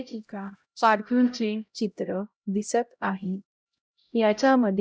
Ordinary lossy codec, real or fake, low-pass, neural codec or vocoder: none; fake; none; codec, 16 kHz, 0.5 kbps, X-Codec, HuBERT features, trained on LibriSpeech